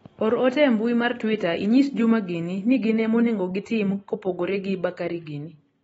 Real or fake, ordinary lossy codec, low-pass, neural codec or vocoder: real; AAC, 24 kbps; 19.8 kHz; none